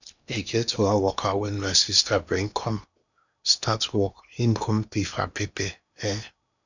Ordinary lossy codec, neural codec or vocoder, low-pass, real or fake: none; codec, 16 kHz in and 24 kHz out, 0.8 kbps, FocalCodec, streaming, 65536 codes; 7.2 kHz; fake